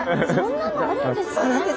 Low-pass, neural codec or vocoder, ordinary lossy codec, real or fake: none; none; none; real